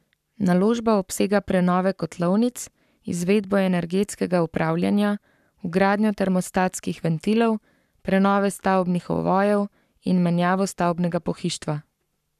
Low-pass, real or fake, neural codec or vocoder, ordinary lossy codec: 14.4 kHz; fake; codec, 44.1 kHz, 7.8 kbps, Pupu-Codec; none